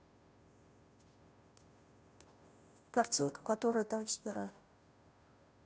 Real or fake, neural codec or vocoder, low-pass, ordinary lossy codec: fake; codec, 16 kHz, 0.5 kbps, FunCodec, trained on Chinese and English, 25 frames a second; none; none